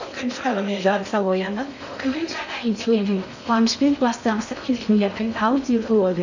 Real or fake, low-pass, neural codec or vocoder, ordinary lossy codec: fake; 7.2 kHz; codec, 16 kHz in and 24 kHz out, 0.6 kbps, FocalCodec, streaming, 4096 codes; none